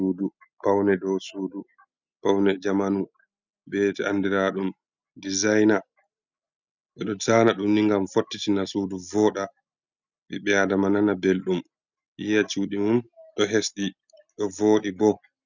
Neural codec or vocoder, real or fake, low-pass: none; real; 7.2 kHz